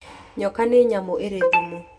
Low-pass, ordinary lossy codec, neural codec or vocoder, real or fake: none; none; none; real